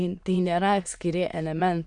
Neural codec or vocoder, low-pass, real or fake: autoencoder, 22.05 kHz, a latent of 192 numbers a frame, VITS, trained on many speakers; 9.9 kHz; fake